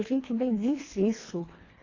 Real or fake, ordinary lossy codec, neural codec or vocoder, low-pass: fake; AAC, 32 kbps; codec, 24 kHz, 1.5 kbps, HILCodec; 7.2 kHz